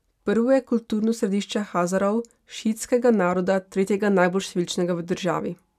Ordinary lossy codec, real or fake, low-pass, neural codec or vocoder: none; real; 14.4 kHz; none